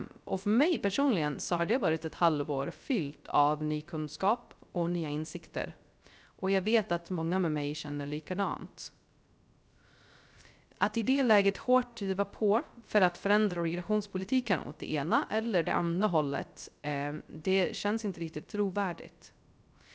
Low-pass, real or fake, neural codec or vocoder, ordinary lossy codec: none; fake; codec, 16 kHz, 0.3 kbps, FocalCodec; none